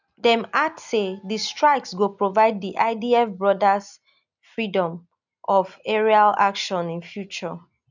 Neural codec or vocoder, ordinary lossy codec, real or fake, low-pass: none; none; real; 7.2 kHz